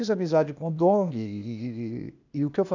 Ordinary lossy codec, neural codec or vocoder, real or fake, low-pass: none; codec, 16 kHz, 0.8 kbps, ZipCodec; fake; 7.2 kHz